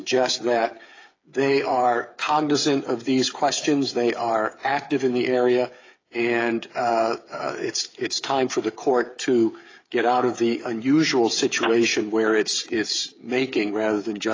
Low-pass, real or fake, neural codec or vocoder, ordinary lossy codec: 7.2 kHz; fake; codec, 16 kHz, 8 kbps, FreqCodec, smaller model; AAC, 32 kbps